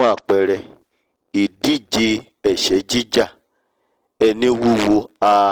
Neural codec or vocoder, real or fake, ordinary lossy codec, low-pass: none; real; Opus, 16 kbps; 19.8 kHz